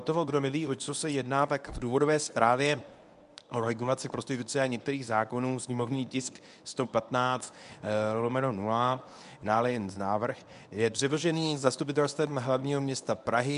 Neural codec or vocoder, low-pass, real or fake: codec, 24 kHz, 0.9 kbps, WavTokenizer, medium speech release version 1; 10.8 kHz; fake